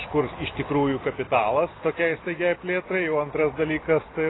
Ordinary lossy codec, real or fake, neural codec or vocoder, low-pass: AAC, 16 kbps; real; none; 7.2 kHz